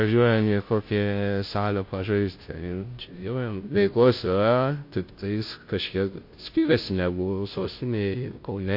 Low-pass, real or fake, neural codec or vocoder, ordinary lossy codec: 5.4 kHz; fake; codec, 16 kHz, 0.5 kbps, FunCodec, trained on Chinese and English, 25 frames a second; MP3, 32 kbps